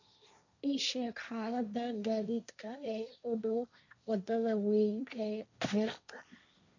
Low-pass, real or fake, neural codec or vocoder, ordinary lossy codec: 7.2 kHz; fake; codec, 16 kHz, 1.1 kbps, Voila-Tokenizer; none